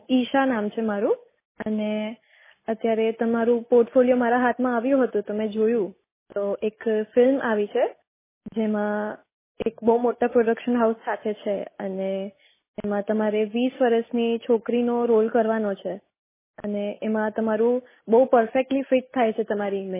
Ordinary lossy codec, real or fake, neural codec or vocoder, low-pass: MP3, 16 kbps; real; none; 3.6 kHz